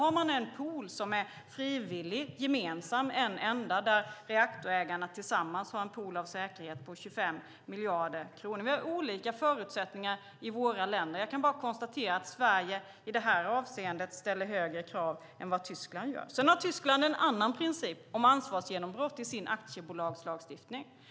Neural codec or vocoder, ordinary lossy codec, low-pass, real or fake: none; none; none; real